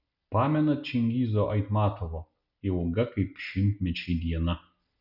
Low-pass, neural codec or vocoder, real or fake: 5.4 kHz; none; real